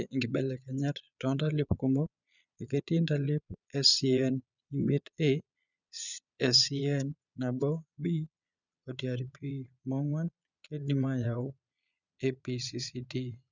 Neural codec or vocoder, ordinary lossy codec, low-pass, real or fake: vocoder, 22.05 kHz, 80 mel bands, Vocos; none; 7.2 kHz; fake